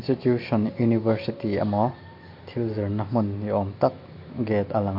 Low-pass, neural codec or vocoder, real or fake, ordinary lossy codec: 5.4 kHz; none; real; MP3, 32 kbps